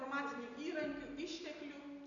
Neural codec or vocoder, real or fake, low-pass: none; real; 7.2 kHz